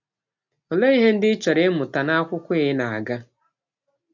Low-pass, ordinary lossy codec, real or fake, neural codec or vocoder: 7.2 kHz; none; real; none